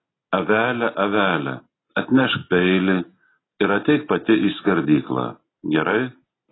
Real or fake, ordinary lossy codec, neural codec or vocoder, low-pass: real; AAC, 16 kbps; none; 7.2 kHz